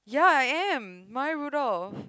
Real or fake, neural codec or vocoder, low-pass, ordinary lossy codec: real; none; none; none